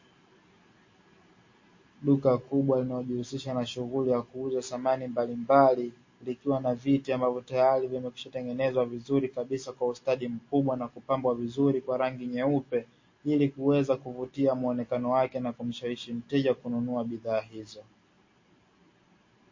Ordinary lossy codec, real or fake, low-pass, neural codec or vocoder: MP3, 32 kbps; real; 7.2 kHz; none